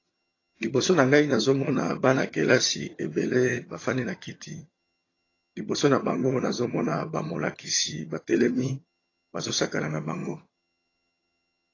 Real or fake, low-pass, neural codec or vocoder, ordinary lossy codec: fake; 7.2 kHz; vocoder, 22.05 kHz, 80 mel bands, HiFi-GAN; AAC, 32 kbps